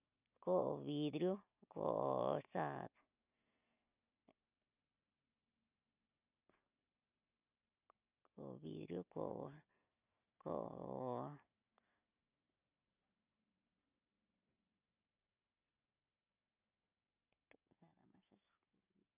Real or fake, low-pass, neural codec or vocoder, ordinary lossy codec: real; 3.6 kHz; none; none